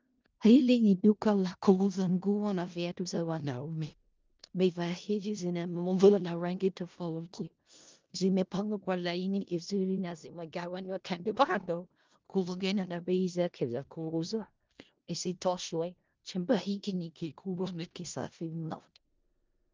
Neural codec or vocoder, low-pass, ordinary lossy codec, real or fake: codec, 16 kHz in and 24 kHz out, 0.4 kbps, LongCat-Audio-Codec, four codebook decoder; 7.2 kHz; Opus, 32 kbps; fake